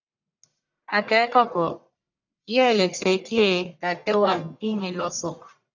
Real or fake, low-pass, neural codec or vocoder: fake; 7.2 kHz; codec, 44.1 kHz, 1.7 kbps, Pupu-Codec